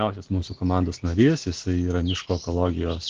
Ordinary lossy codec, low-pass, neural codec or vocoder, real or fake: Opus, 16 kbps; 7.2 kHz; none; real